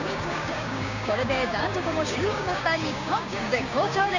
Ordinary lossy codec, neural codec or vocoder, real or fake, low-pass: none; codec, 16 kHz, 6 kbps, DAC; fake; 7.2 kHz